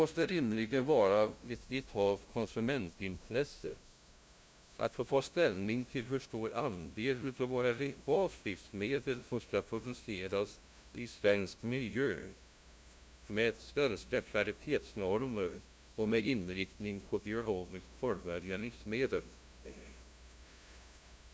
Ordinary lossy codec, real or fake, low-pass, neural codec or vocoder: none; fake; none; codec, 16 kHz, 0.5 kbps, FunCodec, trained on LibriTTS, 25 frames a second